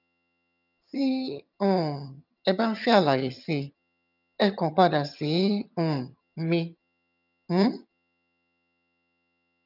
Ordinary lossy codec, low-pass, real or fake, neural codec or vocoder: none; 5.4 kHz; fake; vocoder, 22.05 kHz, 80 mel bands, HiFi-GAN